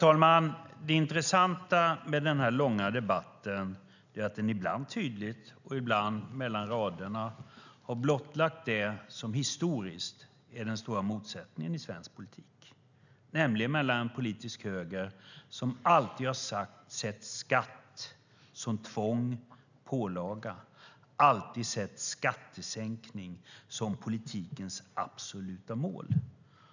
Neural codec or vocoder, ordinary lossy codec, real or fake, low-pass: none; none; real; 7.2 kHz